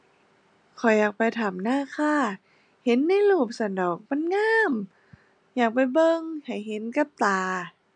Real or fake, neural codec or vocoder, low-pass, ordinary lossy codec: real; none; 9.9 kHz; none